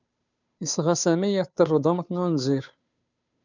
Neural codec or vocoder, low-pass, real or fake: codec, 16 kHz, 2 kbps, FunCodec, trained on Chinese and English, 25 frames a second; 7.2 kHz; fake